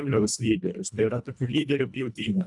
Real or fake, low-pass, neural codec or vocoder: fake; 10.8 kHz; codec, 24 kHz, 1.5 kbps, HILCodec